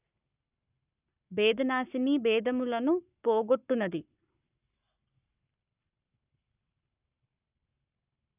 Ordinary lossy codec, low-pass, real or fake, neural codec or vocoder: none; 3.6 kHz; fake; codec, 44.1 kHz, 7.8 kbps, Pupu-Codec